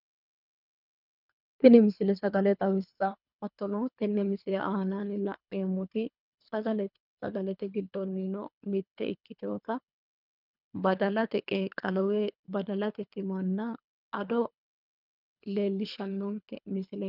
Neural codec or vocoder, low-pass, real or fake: codec, 24 kHz, 3 kbps, HILCodec; 5.4 kHz; fake